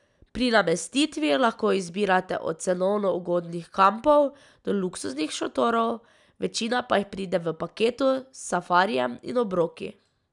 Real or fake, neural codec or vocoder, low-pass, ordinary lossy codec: real; none; 10.8 kHz; none